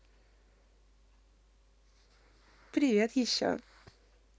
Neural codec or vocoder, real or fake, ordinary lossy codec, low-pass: none; real; none; none